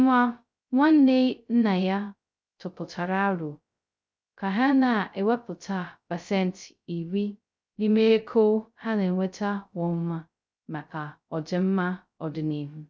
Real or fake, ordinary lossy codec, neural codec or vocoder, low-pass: fake; none; codec, 16 kHz, 0.2 kbps, FocalCodec; none